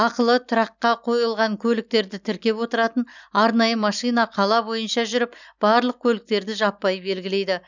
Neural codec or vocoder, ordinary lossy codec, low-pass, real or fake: none; none; 7.2 kHz; real